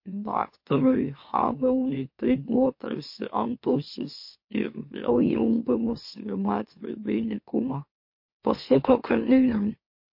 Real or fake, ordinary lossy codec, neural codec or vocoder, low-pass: fake; MP3, 32 kbps; autoencoder, 44.1 kHz, a latent of 192 numbers a frame, MeloTTS; 5.4 kHz